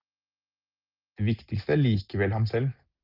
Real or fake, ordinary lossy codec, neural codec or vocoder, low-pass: real; Opus, 24 kbps; none; 5.4 kHz